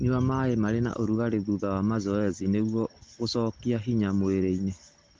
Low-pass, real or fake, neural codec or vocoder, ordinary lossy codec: 7.2 kHz; real; none; Opus, 16 kbps